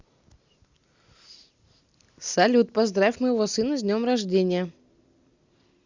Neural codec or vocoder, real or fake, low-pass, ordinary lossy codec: none; real; 7.2 kHz; Opus, 64 kbps